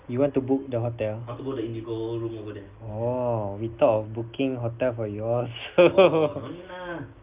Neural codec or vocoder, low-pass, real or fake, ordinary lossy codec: none; 3.6 kHz; real; Opus, 24 kbps